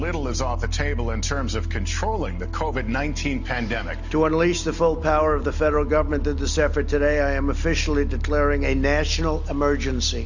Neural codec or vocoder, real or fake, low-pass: none; real; 7.2 kHz